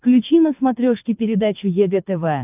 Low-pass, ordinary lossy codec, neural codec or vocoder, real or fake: 3.6 kHz; AAC, 32 kbps; codec, 24 kHz, 6 kbps, HILCodec; fake